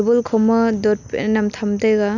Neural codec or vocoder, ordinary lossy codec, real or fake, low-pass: none; none; real; 7.2 kHz